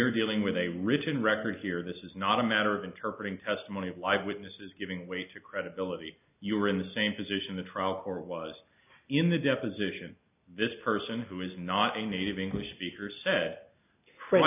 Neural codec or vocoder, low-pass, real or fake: none; 3.6 kHz; real